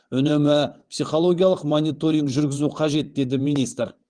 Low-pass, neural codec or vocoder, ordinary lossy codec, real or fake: 9.9 kHz; vocoder, 22.05 kHz, 80 mel bands, WaveNeXt; Opus, 24 kbps; fake